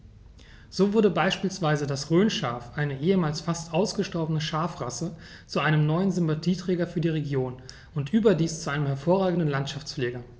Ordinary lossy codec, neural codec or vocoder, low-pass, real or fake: none; none; none; real